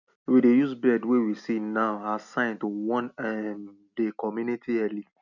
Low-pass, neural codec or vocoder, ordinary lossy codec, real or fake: 7.2 kHz; none; none; real